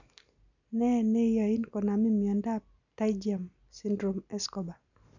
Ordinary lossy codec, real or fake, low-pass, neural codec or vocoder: none; real; 7.2 kHz; none